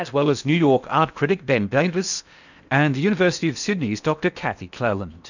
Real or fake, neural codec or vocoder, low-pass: fake; codec, 16 kHz in and 24 kHz out, 0.8 kbps, FocalCodec, streaming, 65536 codes; 7.2 kHz